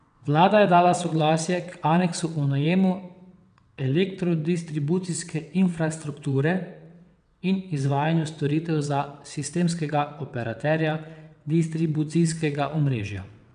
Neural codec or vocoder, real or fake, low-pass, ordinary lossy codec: vocoder, 22.05 kHz, 80 mel bands, WaveNeXt; fake; 9.9 kHz; none